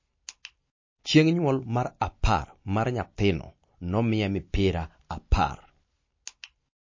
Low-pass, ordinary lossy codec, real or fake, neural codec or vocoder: 7.2 kHz; MP3, 32 kbps; real; none